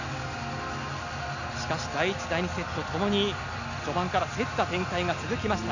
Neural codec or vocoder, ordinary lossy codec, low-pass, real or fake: none; none; 7.2 kHz; real